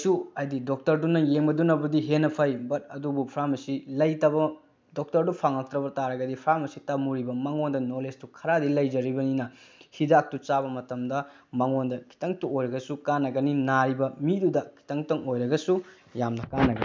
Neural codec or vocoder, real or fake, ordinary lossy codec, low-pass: none; real; none; none